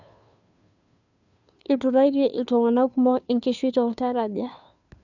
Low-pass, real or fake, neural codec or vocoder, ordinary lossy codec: 7.2 kHz; fake; codec, 16 kHz, 2 kbps, FunCodec, trained on Chinese and English, 25 frames a second; none